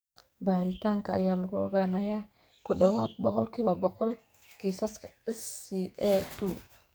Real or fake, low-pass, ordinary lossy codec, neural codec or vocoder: fake; none; none; codec, 44.1 kHz, 2.6 kbps, SNAC